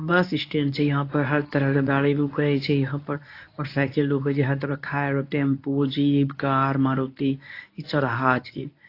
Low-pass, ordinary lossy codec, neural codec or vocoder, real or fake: 5.4 kHz; AAC, 32 kbps; codec, 24 kHz, 0.9 kbps, WavTokenizer, medium speech release version 1; fake